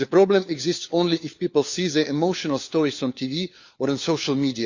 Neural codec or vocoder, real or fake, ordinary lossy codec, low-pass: codec, 16 kHz, 4 kbps, FunCodec, trained on LibriTTS, 50 frames a second; fake; Opus, 64 kbps; 7.2 kHz